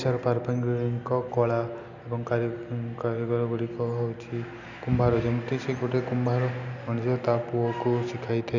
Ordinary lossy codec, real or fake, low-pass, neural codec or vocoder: none; real; 7.2 kHz; none